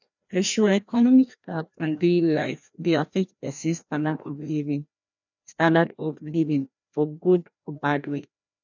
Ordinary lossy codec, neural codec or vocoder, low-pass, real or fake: none; codec, 16 kHz, 1 kbps, FreqCodec, larger model; 7.2 kHz; fake